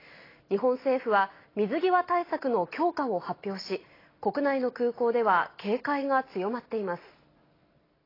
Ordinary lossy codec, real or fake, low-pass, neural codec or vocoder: AAC, 24 kbps; real; 5.4 kHz; none